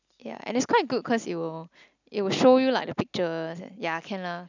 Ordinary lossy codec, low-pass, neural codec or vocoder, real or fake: none; 7.2 kHz; none; real